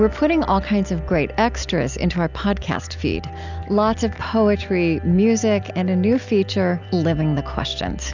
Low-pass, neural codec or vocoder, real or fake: 7.2 kHz; none; real